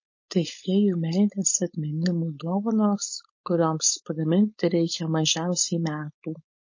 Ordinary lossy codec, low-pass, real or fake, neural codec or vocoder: MP3, 32 kbps; 7.2 kHz; fake; codec, 16 kHz, 4.8 kbps, FACodec